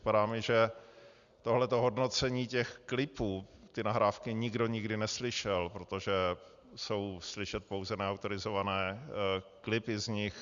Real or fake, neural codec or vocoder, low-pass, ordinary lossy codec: real; none; 7.2 kHz; Opus, 64 kbps